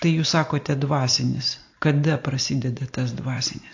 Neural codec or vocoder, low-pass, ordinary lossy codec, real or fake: none; 7.2 kHz; AAC, 48 kbps; real